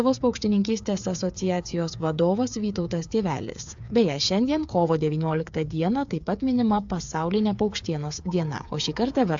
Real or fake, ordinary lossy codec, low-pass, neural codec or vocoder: fake; AAC, 64 kbps; 7.2 kHz; codec, 16 kHz, 8 kbps, FreqCodec, smaller model